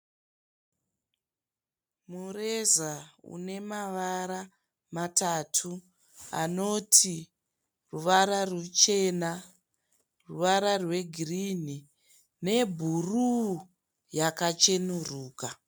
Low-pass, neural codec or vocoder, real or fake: 19.8 kHz; none; real